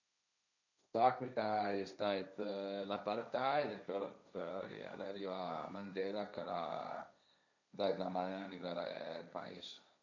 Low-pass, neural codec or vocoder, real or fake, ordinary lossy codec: 7.2 kHz; codec, 16 kHz, 1.1 kbps, Voila-Tokenizer; fake; none